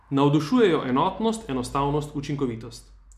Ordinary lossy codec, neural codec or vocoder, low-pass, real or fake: MP3, 96 kbps; none; 14.4 kHz; real